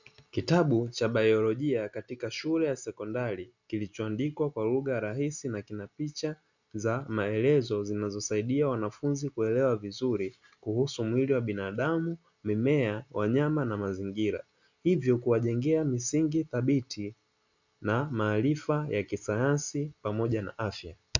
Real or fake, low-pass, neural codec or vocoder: real; 7.2 kHz; none